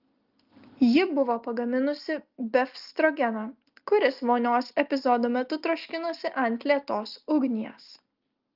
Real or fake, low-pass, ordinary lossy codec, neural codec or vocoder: real; 5.4 kHz; Opus, 24 kbps; none